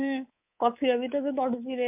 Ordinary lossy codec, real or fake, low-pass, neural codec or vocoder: MP3, 32 kbps; fake; 3.6 kHz; codec, 24 kHz, 3.1 kbps, DualCodec